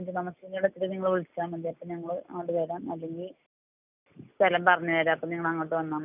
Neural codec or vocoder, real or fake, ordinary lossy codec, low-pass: none; real; none; 3.6 kHz